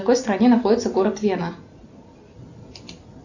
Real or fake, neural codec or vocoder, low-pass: real; none; 7.2 kHz